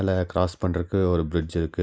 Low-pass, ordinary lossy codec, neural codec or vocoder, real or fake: none; none; none; real